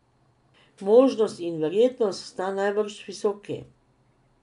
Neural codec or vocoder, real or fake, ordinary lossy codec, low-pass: none; real; none; 10.8 kHz